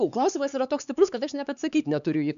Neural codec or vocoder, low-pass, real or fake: codec, 16 kHz, 4 kbps, X-Codec, HuBERT features, trained on LibriSpeech; 7.2 kHz; fake